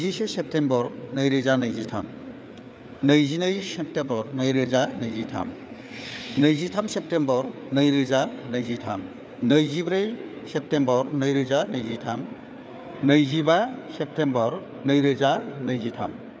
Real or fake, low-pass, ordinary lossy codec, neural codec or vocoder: fake; none; none; codec, 16 kHz, 4 kbps, FreqCodec, larger model